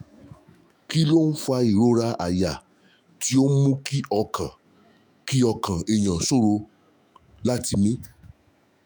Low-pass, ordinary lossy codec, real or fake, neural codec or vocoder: none; none; fake; autoencoder, 48 kHz, 128 numbers a frame, DAC-VAE, trained on Japanese speech